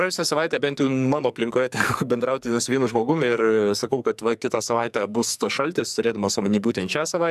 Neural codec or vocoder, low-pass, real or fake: codec, 44.1 kHz, 2.6 kbps, SNAC; 14.4 kHz; fake